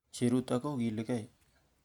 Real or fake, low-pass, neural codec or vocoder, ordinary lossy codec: real; 19.8 kHz; none; none